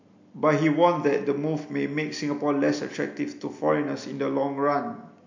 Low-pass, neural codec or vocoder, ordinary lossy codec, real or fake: 7.2 kHz; none; MP3, 48 kbps; real